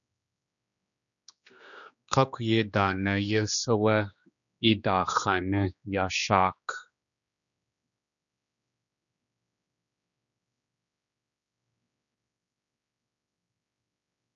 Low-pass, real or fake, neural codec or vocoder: 7.2 kHz; fake; codec, 16 kHz, 2 kbps, X-Codec, HuBERT features, trained on general audio